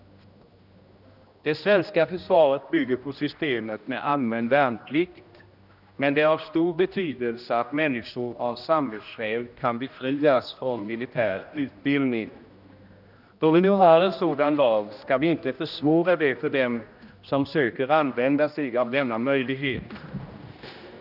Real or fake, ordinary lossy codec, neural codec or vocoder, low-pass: fake; none; codec, 16 kHz, 1 kbps, X-Codec, HuBERT features, trained on general audio; 5.4 kHz